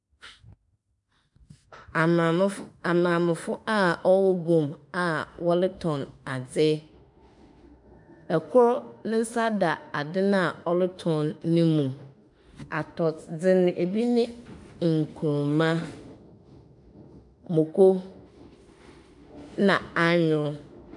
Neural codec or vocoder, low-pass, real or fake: autoencoder, 48 kHz, 32 numbers a frame, DAC-VAE, trained on Japanese speech; 10.8 kHz; fake